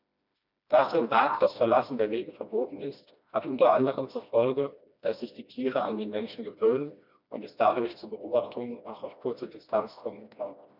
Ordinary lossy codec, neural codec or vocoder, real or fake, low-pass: none; codec, 16 kHz, 1 kbps, FreqCodec, smaller model; fake; 5.4 kHz